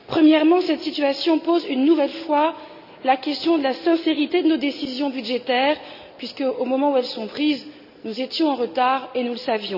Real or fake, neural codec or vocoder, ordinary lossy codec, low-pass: real; none; none; 5.4 kHz